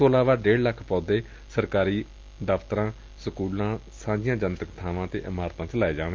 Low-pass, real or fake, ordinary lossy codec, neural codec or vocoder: 7.2 kHz; real; Opus, 24 kbps; none